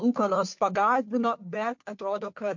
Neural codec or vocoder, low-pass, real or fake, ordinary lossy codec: codec, 16 kHz, 2 kbps, FunCodec, trained on Chinese and English, 25 frames a second; 7.2 kHz; fake; MP3, 48 kbps